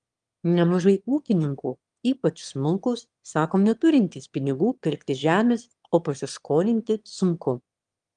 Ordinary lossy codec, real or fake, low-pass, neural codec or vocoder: Opus, 32 kbps; fake; 9.9 kHz; autoencoder, 22.05 kHz, a latent of 192 numbers a frame, VITS, trained on one speaker